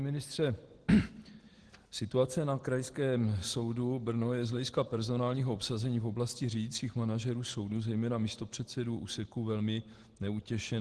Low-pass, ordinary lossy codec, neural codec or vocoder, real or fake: 10.8 kHz; Opus, 16 kbps; none; real